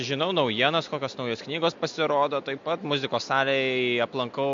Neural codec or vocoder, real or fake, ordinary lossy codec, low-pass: none; real; MP3, 48 kbps; 7.2 kHz